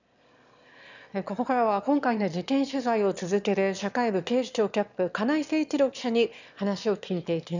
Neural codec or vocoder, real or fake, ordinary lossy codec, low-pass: autoencoder, 22.05 kHz, a latent of 192 numbers a frame, VITS, trained on one speaker; fake; none; 7.2 kHz